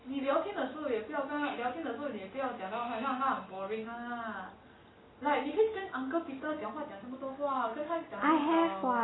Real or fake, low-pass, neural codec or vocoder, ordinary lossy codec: real; 7.2 kHz; none; AAC, 16 kbps